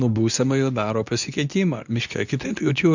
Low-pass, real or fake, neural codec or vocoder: 7.2 kHz; fake; codec, 24 kHz, 0.9 kbps, WavTokenizer, medium speech release version 2